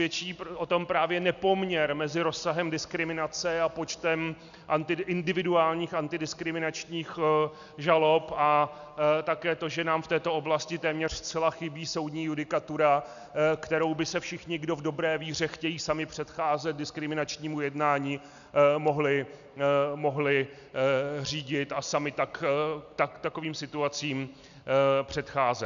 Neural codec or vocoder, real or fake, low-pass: none; real; 7.2 kHz